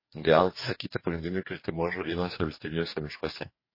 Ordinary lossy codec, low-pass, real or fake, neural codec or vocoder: MP3, 24 kbps; 5.4 kHz; fake; codec, 44.1 kHz, 2.6 kbps, DAC